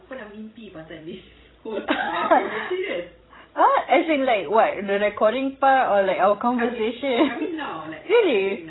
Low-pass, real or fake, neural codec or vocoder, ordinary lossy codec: 7.2 kHz; fake; codec, 16 kHz, 16 kbps, FreqCodec, larger model; AAC, 16 kbps